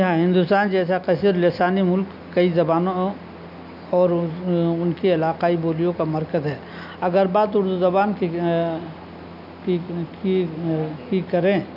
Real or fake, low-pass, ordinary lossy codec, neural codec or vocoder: real; 5.4 kHz; none; none